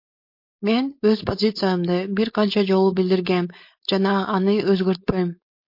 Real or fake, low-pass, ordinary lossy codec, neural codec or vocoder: fake; 5.4 kHz; MP3, 32 kbps; codec, 16 kHz, 4.8 kbps, FACodec